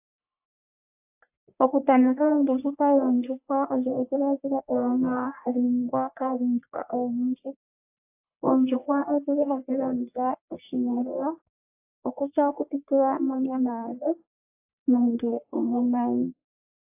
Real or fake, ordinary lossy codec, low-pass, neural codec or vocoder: fake; AAC, 32 kbps; 3.6 kHz; codec, 44.1 kHz, 1.7 kbps, Pupu-Codec